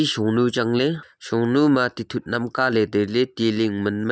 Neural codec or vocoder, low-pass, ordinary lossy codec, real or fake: none; none; none; real